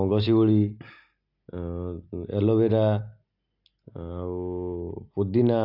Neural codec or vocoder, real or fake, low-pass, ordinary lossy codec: none; real; 5.4 kHz; AAC, 48 kbps